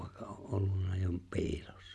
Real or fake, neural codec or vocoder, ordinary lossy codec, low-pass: real; none; none; none